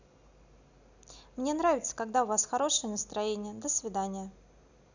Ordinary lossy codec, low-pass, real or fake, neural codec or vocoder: none; 7.2 kHz; real; none